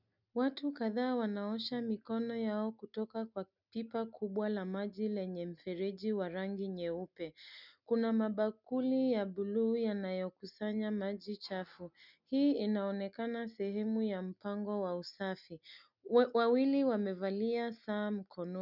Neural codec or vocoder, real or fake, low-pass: none; real; 5.4 kHz